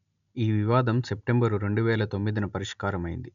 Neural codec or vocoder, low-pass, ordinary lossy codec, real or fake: none; 7.2 kHz; none; real